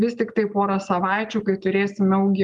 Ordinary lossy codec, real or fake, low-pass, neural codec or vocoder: Opus, 32 kbps; real; 10.8 kHz; none